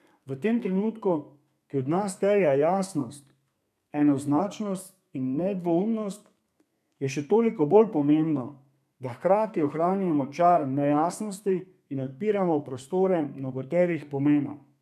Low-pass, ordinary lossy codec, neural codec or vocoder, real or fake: 14.4 kHz; none; codec, 32 kHz, 1.9 kbps, SNAC; fake